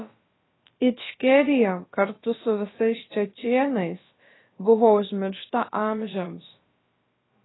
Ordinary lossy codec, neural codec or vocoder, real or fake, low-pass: AAC, 16 kbps; codec, 16 kHz, about 1 kbps, DyCAST, with the encoder's durations; fake; 7.2 kHz